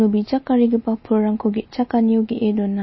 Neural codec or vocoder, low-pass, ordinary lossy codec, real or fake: none; 7.2 kHz; MP3, 24 kbps; real